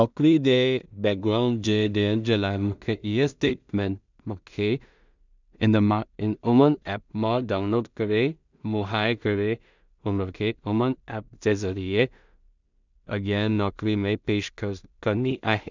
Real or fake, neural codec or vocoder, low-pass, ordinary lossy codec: fake; codec, 16 kHz in and 24 kHz out, 0.4 kbps, LongCat-Audio-Codec, two codebook decoder; 7.2 kHz; none